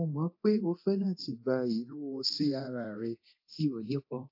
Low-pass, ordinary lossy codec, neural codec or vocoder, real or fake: 5.4 kHz; AAC, 32 kbps; codec, 24 kHz, 0.9 kbps, DualCodec; fake